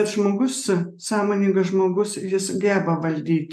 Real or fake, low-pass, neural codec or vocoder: real; 14.4 kHz; none